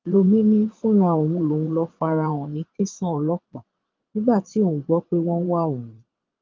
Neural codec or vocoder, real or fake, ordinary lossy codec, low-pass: vocoder, 22.05 kHz, 80 mel bands, Vocos; fake; Opus, 24 kbps; 7.2 kHz